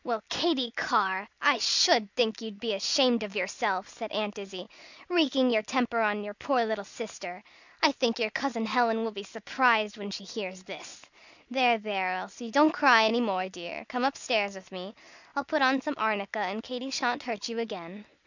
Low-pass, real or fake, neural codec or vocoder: 7.2 kHz; real; none